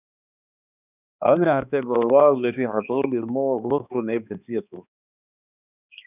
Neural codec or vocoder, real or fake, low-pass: codec, 16 kHz, 2 kbps, X-Codec, HuBERT features, trained on balanced general audio; fake; 3.6 kHz